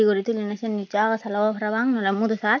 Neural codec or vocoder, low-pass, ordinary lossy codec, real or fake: none; 7.2 kHz; MP3, 64 kbps; real